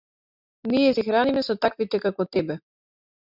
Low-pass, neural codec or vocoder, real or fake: 5.4 kHz; none; real